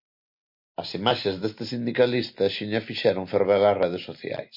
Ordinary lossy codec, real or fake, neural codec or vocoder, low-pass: MP3, 32 kbps; real; none; 5.4 kHz